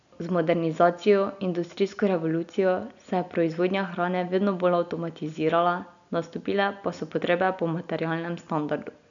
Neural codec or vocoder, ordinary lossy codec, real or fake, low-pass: none; none; real; 7.2 kHz